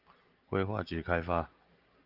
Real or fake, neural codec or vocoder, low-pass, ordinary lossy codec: real; none; 5.4 kHz; Opus, 32 kbps